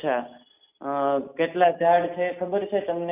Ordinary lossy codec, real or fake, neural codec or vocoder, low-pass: none; real; none; 3.6 kHz